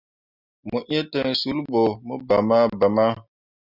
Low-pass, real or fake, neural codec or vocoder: 5.4 kHz; real; none